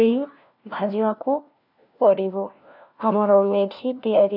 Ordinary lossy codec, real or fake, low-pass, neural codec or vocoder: none; fake; 5.4 kHz; codec, 16 kHz, 1 kbps, FunCodec, trained on LibriTTS, 50 frames a second